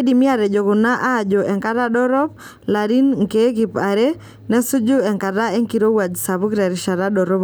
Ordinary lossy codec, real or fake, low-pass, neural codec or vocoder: none; real; none; none